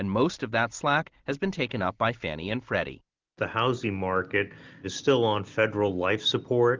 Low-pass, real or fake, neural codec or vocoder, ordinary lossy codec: 7.2 kHz; real; none; Opus, 24 kbps